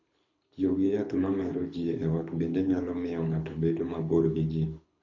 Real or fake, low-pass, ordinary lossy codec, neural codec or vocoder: fake; 7.2 kHz; MP3, 64 kbps; codec, 24 kHz, 6 kbps, HILCodec